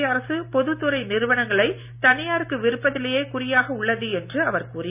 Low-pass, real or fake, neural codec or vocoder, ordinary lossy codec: 3.6 kHz; real; none; none